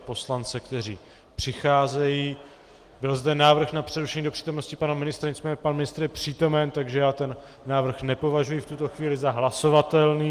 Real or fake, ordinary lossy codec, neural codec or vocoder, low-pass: real; Opus, 24 kbps; none; 14.4 kHz